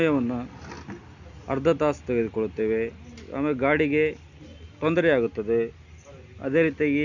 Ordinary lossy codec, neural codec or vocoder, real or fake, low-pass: none; none; real; 7.2 kHz